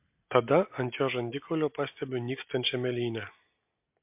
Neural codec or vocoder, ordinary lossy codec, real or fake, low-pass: none; MP3, 32 kbps; real; 3.6 kHz